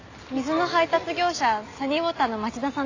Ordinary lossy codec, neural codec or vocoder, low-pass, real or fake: AAC, 48 kbps; none; 7.2 kHz; real